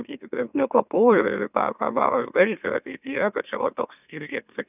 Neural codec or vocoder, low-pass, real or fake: autoencoder, 44.1 kHz, a latent of 192 numbers a frame, MeloTTS; 3.6 kHz; fake